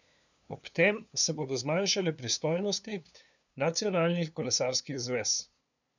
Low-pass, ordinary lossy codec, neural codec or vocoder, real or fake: 7.2 kHz; none; codec, 16 kHz, 2 kbps, FunCodec, trained on LibriTTS, 25 frames a second; fake